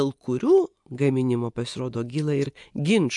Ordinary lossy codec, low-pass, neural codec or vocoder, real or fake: MP3, 64 kbps; 10.8 kHz; vocoder, 24 kHz, 100 mel bands, Vocos; fake